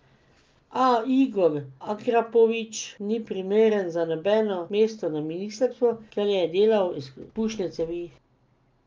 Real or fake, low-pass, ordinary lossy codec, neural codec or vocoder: real; 7.2 kHz; Opus, 32 kbps; none